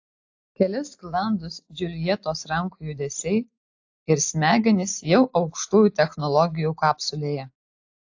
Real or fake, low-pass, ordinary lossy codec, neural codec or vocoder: real; 7.2 kHz; AAC, 48 kbps; none